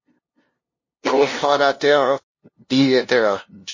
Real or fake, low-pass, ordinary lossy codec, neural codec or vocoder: fake; 7.2 kHz; MP3, 32 kbps; codec, 16 kHz, 0.5 kbps, FunCodec, trained on LibriTTS, 25 frames a second